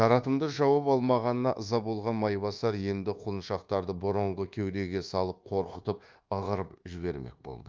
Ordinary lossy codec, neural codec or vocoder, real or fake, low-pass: Opus, 24 kbps; codec, 24 kHz, 1.2 kbps, DualCodec; fake; 7.2 kHz